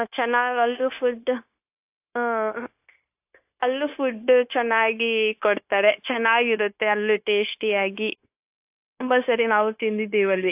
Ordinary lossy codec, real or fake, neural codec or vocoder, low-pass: AAC, 32 kbps; fake; codec, 16 kHz, 0.9 kbps, LongCat-Audio-Codec; 3.6 kHz